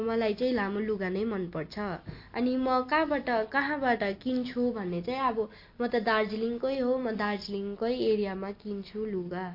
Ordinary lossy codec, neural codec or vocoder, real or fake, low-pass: MP3, 32 kbps; none; real; 5.4 kHz